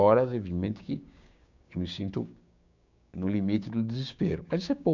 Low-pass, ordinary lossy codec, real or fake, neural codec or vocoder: 7.2 kHz; none; fake; codec, 16 kHz, 6 kbps, DAC